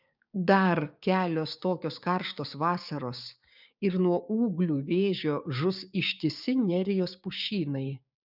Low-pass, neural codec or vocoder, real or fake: 5.4 kHz; codec, 16 kHz, 6 kbps, DAC; fake